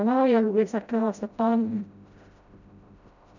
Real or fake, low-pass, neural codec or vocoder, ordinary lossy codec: fake; 7.2 kHz; codec, 16 kHz, 0.5 kbps, FreqCodec, smaller model; none